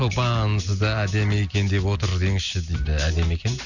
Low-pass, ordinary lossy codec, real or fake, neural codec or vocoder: 7.2 kHz; none; real; none